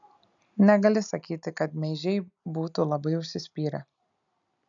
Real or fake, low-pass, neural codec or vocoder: real; 7.2 kHz; none